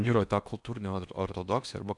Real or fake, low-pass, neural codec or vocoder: fake; 10.8 kHz; codec, 16 kHz in and 24 kHz out, 0.8 kbps, FocalCodec, streaming, 65536 codes